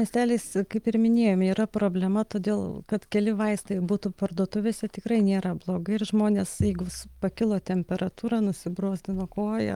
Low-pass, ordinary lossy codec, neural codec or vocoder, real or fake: 19.8 kHz; Opus, 32 kbps; none; real